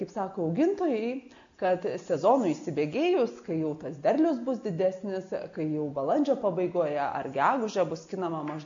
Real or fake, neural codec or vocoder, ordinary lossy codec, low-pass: real; none; MP3, 64 kbps; 7.2 kHz